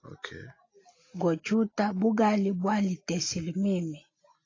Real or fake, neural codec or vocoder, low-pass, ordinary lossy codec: real; none; 7.2 kHz; AAC, 32 kbps